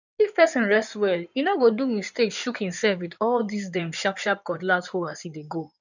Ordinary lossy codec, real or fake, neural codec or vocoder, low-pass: none; fake; codec, 16 kHz in and 24 kHz out, 2.2 kbps, FireRedTTS-2 codec; 7.2 kHz